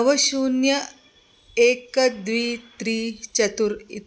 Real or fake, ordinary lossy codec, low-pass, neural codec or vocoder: real; none; none; none